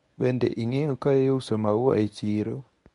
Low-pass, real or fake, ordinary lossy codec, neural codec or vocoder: 10.8 kHz; fake; MP3, 64 kbps; codec, 24 kHz, 0.9 kbps, WavTokenizer, medium speech release version 1